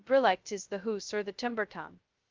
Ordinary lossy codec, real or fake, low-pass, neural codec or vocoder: Opus, 32 kbps; fake; 7.2 kHz; codec, 16 kHz, 0.2 kbps, FocalCodec